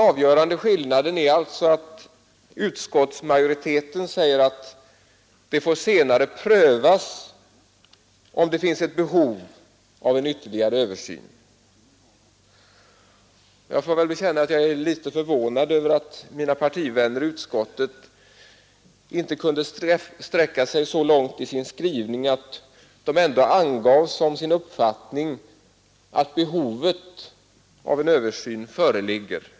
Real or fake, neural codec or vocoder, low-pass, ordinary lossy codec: real; none; none; none